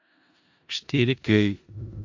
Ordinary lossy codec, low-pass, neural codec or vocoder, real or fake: AAC, 48 kbps; 7.2 kHz; codec, 16 kHz in and 24 kHz out, 0.4 kbps, LongCat-Audio-Codec, four codebook decoder; fake